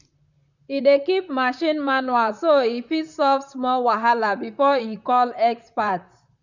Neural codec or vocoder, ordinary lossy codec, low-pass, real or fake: vocoder, 44.1 kHz, 128 mel bands, Pupu-Vocoder; none; 7.2 kHz; fake